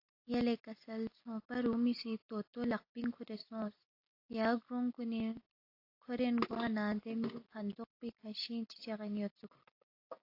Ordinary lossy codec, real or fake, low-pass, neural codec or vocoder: AAC, 32 kbps; real; 5.4 kHz; none